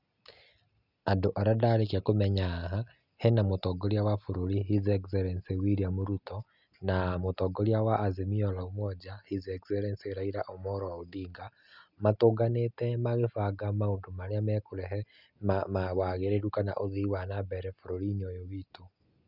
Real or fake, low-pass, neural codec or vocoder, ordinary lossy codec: real; 5.4 kHz; none; none